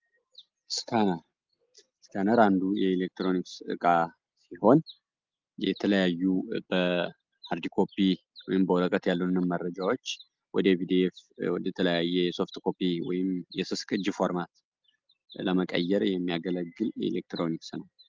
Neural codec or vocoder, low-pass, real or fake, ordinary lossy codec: none; 7.2 kHz; real; Opus, 32 kbps